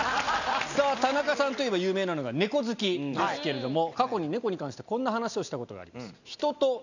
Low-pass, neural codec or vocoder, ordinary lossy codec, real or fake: 7.2 kHz; none; none; real